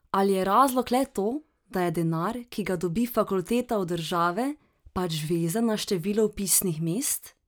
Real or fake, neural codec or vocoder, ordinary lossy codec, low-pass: real; none; none; none